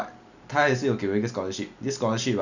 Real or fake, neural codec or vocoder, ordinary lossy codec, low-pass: real; none; none; 7.2 kHz